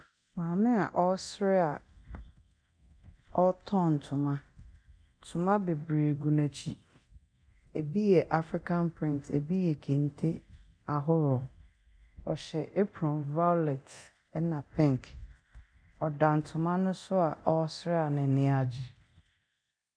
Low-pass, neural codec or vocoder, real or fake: 9.9 kHz; codec, 24 kHz, 0.9 kbps, DualCodec; fake